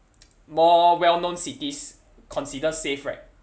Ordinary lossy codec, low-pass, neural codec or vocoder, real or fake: none; none; none; real